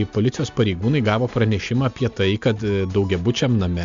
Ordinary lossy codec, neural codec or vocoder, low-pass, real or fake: MP3, 64 kbps; none; 7.2 kHz; real